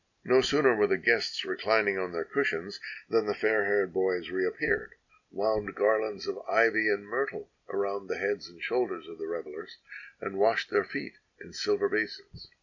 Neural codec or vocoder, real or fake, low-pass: none; real; 7.2 kHz